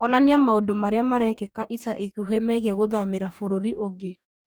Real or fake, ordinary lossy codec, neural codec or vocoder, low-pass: fake; none; codec, 44.1 kHz, 2.6 kbps, DAC; none